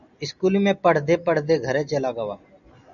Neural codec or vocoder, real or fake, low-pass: none; real; 7.2 kHz